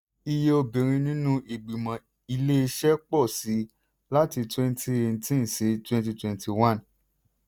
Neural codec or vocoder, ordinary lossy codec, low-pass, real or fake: none; none; none; real